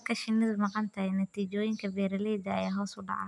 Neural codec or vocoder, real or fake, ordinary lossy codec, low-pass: none; real; MP3, 96 kbps; 10.8 kHz